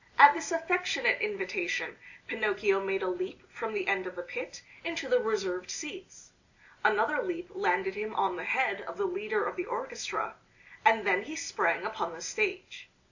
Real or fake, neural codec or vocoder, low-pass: real; none; 7.2 kHz